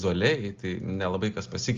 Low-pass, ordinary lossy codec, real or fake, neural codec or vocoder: 7.2 kHz; Opus, 32 kbps; real; none